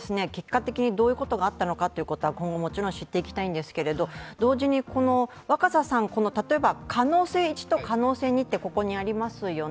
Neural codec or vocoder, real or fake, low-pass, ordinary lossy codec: none; real; none; none